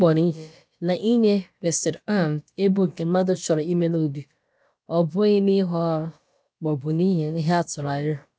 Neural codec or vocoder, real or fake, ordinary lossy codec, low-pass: codec, 16 kHz, about 1 kbps, DyCAST, with the encoder's durations; fake; none; none